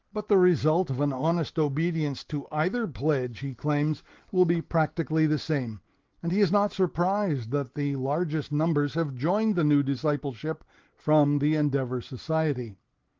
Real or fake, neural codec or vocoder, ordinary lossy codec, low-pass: real; none; Opus, 16 kbps; 7.2 kHz